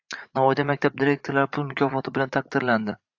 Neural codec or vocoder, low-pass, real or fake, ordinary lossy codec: vocoder, 44.1 kHz, 80 mel bands, Vocos; 7.2 kHz; fake; AAC, 48 kbps